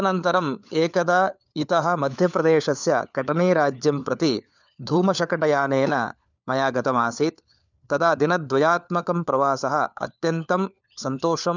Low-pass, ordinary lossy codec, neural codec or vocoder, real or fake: 7.2 kHz; none; codec, 16 kHz, 4 kbps, FunCodec, trained on LibriTTS, 50 frames a second; fake